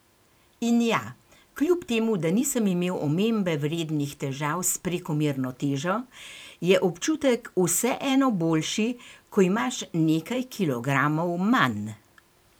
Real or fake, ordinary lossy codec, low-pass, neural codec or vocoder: fake; none; none; vocoder, 44.1 kHz, 128 mel bands every 512 samples, BigVGAN v2